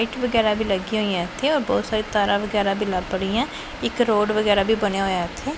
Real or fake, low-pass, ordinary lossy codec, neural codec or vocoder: real; none; none; none